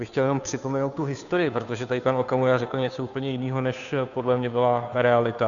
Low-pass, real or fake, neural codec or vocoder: 7.2 kHz; fake; codec, 16 kHz, 2 kbps, FunCodec, trained on Chinese and English, 25 frames a second